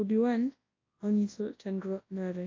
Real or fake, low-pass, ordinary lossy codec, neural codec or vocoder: fake; 7.2 kHz; AAC, 32 kbps; codec, 24 kHz, 0.9 kbps, WavTokenizer, large speech release